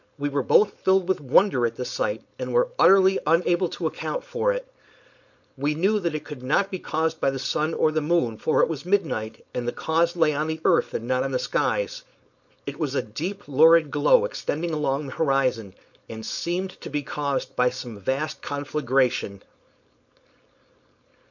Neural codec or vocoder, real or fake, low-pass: codec, 16 kHz, 4.8 kbps, FACodec; fake; 7.2 kHz